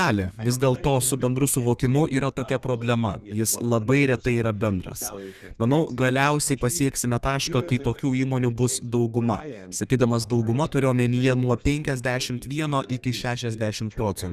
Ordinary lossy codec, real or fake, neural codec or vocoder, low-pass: Opus, 64 kbps; fake; codec, 32 kHz, 1.9 kbps, SNAC; 14.4 kHz